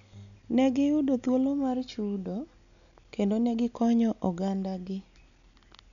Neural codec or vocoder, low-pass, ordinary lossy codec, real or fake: none; 7.2 kHz; none; real